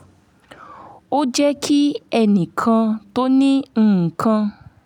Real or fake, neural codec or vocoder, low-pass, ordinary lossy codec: real; none; 19.8 kHz; none